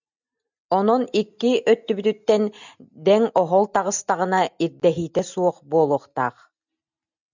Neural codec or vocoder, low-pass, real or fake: none; 7.2 kHz; real